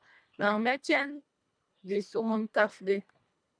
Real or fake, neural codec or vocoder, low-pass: fake; codec, 24 kHz, 1.5 kbps, HILCodec; 9.9 kHz